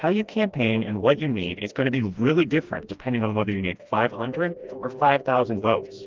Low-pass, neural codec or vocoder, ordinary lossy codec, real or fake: 7.2 kHz; codec, 16 kHz, 1 kbps, FreqCodec, smaller model; Opus, 24 kbps; fake